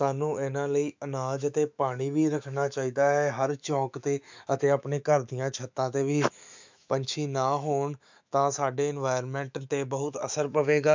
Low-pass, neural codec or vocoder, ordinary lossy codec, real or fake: 7.2 kHz; autoencoder, 48 kHz, 128 numbers a frame, DAC-VAE, trained on Japanese speech; MP3, 64 kbps; fake